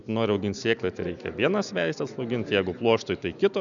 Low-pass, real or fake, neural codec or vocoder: 7.2 kHz; real; none